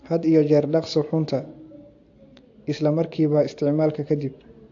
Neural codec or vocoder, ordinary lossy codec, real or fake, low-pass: none; AAC, 48 kbps; real; 7.2 kHz